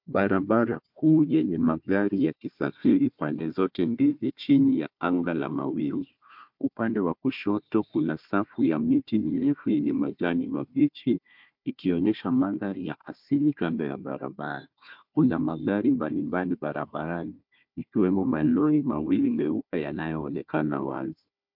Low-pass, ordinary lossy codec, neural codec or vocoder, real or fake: 5.4 kHz; MP3, 48 kbps; codec, 16 kHz, 1 kbps, FunCodec, trained on Chinese and English, 50 frames a second; fake